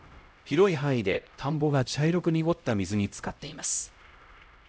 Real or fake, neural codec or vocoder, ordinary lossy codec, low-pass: fake; codec, 16 kHz, 0.5 kbps, X-Codec, HuBERT features, trained on LibriSpeech; none; none